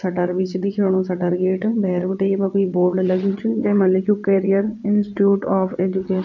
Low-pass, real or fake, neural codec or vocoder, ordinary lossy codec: 7.2 kHz; fake; vocoder, 22.05 kHz, 80 mel bands, WaveNeXt; none